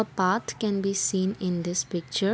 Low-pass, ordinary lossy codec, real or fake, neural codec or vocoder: none; none; real; none